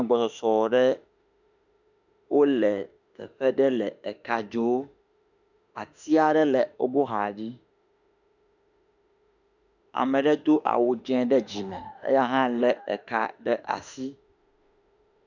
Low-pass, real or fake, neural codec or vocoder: 7.2 kHz; fake; autoencoder, 48 kHz, 32 numbers a frame, DAC-VAE, trained on Japanese speech